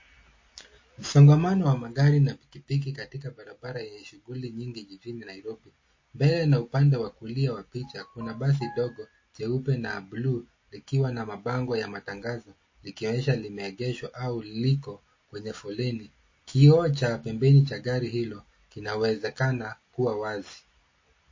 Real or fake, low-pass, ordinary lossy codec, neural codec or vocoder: real; 7.2 kHz; MP3, 32 kbps; none